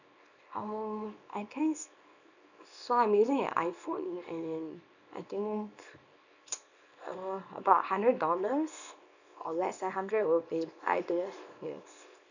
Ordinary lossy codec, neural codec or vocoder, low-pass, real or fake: none; codec, 24 kHz, 0.9 kbps, WavTokenizer, small release; 7.2 kHz; fake